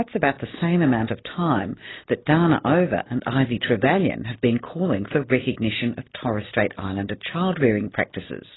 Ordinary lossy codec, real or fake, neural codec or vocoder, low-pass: AAC, 16 kbps; real; none; 7.2 kHz